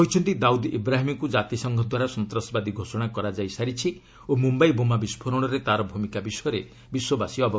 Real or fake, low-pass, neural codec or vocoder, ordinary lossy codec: real; none; none; none